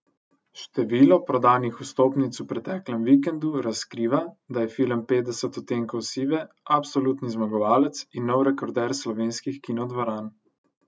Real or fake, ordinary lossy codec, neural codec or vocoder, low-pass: real; none; none; none